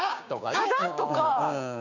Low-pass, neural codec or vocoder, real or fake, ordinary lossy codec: 7.2 kHz; codec, 16 kHz, 6 kbps, DAC; fake; none